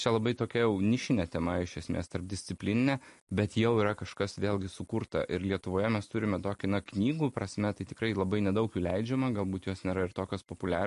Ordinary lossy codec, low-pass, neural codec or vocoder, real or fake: MP3, 48 kbps; 14.4 kHz; none; real